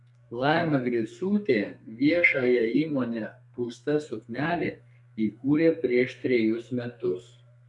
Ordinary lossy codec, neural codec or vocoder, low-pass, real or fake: MP3, 96 kbps; codec, 32 kHz, 1.9 kbps, SNAC; 10.8 kHz; fake